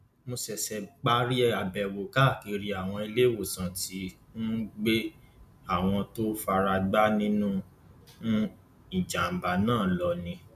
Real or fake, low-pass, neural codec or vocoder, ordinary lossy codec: real; 14.4 kHz; none; none